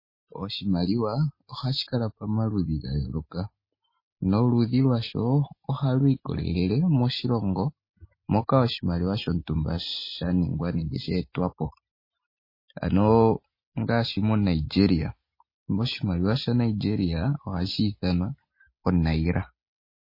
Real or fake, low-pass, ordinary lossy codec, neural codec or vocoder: real; 5.4 kHz; MP3, 24 kbps; none